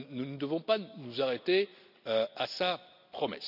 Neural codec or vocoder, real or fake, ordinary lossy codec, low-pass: none; real; none; 5.4 kHz